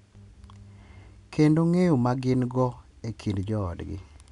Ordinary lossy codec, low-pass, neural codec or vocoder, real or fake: none; 10.8 kHz; none; real